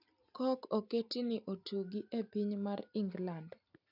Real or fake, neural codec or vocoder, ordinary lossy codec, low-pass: real; none; none; 5.4 kHz